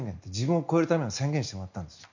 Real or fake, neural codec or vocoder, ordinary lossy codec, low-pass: real; none; none; 7.2 kHz